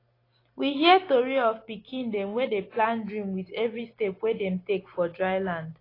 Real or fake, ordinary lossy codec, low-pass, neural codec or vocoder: real; AAC, 24 kbps; 5.4 kHz; none